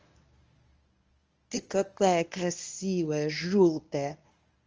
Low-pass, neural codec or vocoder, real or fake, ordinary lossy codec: 7.2 kHz; codec, 24 kHz, 0.9 kbps, WavTokenizer, medium speech release version 1; fake; Opus, 24 kbps